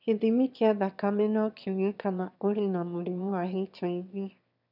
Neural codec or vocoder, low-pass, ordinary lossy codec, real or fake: autoencoder, 22.05 kHz, a latent of 192 numbers a frame, VITS, trained on one speaker; 5.4 kHz; none; fake